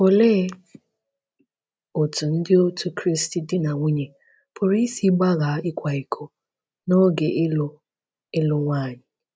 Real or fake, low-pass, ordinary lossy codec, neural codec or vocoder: real; none; none; none